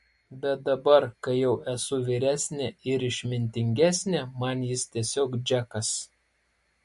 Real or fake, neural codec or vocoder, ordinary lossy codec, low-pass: real; none; MP3, 48 kbps; 14.4 kHz